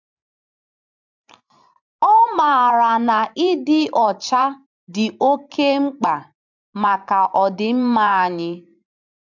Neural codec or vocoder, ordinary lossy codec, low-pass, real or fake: vocoder, 44.1 kHz, 128 mel bands every 512 samples, BigVGAN v2; MP3, 64 kbps; 7.2 kHz; fake